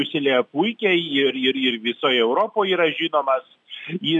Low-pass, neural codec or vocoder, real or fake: 10.8 kHz; none; real